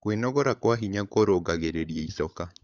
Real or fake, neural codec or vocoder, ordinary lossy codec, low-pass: fake; codec, 16 kHz, 16 kbps, FunCodec, trained on LibriTTS, 50 frames a second; none; 7.2 kHz